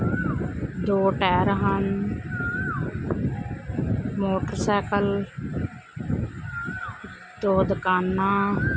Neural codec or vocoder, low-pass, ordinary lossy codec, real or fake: none; none; none; real